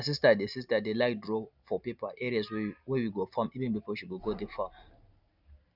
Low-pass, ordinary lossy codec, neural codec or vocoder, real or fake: 5.4 kHz; none; none; real